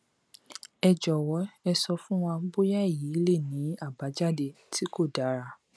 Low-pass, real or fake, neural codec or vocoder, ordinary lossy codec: none; real; none; none